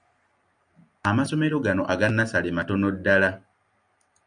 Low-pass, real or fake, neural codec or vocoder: 9.9 kHz; real; none